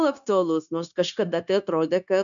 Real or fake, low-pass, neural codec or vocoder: fake; 7.2 kHz; codec, 16 kHz, 0.9 kbps, LongCat-Audio-Codec